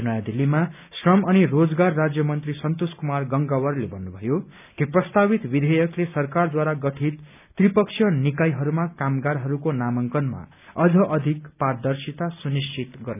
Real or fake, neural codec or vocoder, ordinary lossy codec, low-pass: real; none; none; 3.6 kHz